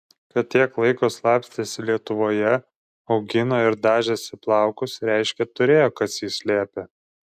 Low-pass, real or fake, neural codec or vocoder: 14.4 kHz; real; none